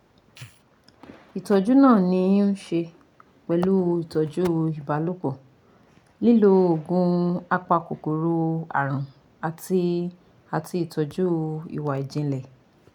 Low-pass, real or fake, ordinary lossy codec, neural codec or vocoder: 19.8 kHz; real; none; none